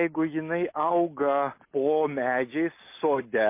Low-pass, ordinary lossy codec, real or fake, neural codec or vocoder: 3.6 kHz; MP3, 24 kbps; real; none